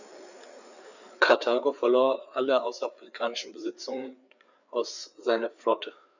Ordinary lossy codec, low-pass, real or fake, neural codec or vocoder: none; 7.2 kHz; fake; codec, 16 kHz, 4 kbps, FreqCodec, larger model